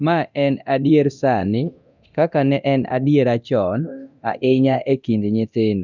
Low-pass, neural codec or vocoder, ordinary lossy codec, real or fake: 7.2 kHz; codec, 24 kHz, 0.9 kbps, DualCodec; none; fake